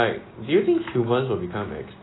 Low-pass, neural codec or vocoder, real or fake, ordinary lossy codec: 7.2 kHz; none; real; AAC, 16 kbps